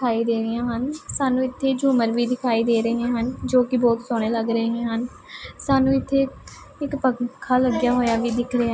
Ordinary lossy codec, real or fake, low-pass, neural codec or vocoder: none; real; none; none